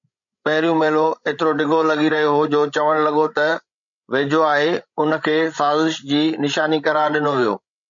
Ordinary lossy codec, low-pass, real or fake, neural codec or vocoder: MP3, 64 kbps; 7.2 kHz; fake; codec, 16 kHz, 16 kbps, FreqCodec, larger model